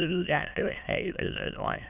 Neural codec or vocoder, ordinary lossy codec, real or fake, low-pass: autoencoder, 22.05 kHz, a latent of 192 numbers a frame, VITS, trained on many speakers; none; fake; 3.6 kHz